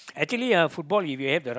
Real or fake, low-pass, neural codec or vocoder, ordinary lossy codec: real; none; none; none